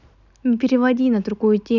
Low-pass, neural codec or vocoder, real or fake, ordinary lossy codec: 7.2 kHz; none; real; none